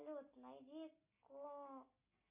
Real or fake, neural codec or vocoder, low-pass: real; none; 3.6 kHz